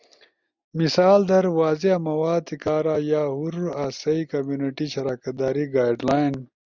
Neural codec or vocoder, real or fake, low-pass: none; real; 7.2 kHz